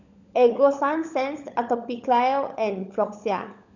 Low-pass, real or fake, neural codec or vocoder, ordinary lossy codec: 7.2 kHz; fake; codec, 16 kHz, 16 kbps, FunCodec, trained on LibriTTS, 50 frames a second; none